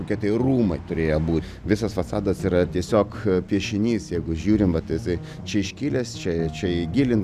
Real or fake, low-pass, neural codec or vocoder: real; 14.4 kHz; none